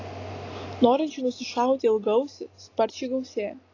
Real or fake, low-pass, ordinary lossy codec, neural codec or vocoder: real; 7.2 kHz; AAC, 32 kbps; none